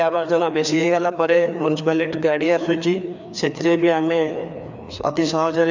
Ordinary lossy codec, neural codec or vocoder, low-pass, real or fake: none; codec, 16 kHz, 2 kbps, FreqCodec, larger model; 7.2 kHz; fake